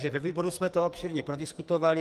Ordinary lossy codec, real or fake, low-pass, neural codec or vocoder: Opus, 32 kbps; fake; 14.4 kHz; codec, 44.1 kHz, 2.6 kbps, SNAC